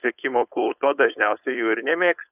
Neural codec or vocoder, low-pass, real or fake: codec, 16 kHz, 4.8 kbps, FACodec; 3.6 kHz; fake